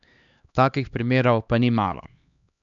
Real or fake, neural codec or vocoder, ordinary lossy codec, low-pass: fake; codec, 16 kHz, 2 kbps, X-Codec, HuBERT features, trained on LibriSpeech; none; 7.2 kHz